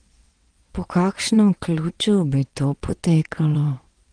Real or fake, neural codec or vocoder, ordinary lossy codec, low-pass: fake; vocoder, 22.05 kHz, 80 mel bands, WaveNeXt; Opus, 24 kbps; 9.9 kHz